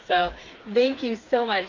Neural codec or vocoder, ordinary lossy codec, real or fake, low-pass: codec, 16 kHz, 4 kbps, FreqCodec, smaller model; AAC, 48 kbps; fake; 7.2 kHz